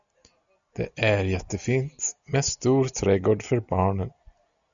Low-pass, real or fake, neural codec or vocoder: 7.2 kHz; real; none